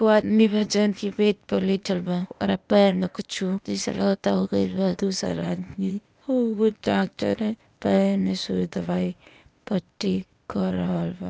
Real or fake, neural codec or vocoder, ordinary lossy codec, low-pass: fake; codec, 16 kHz, 0.8 kbps, ZipCodec; none; none